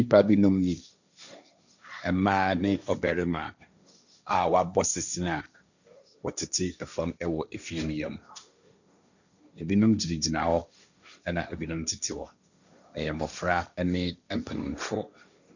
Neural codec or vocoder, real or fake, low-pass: codec, 16 kHz, 1.1 kbps, Voila-Tokenizer; fake; 7.2 kHz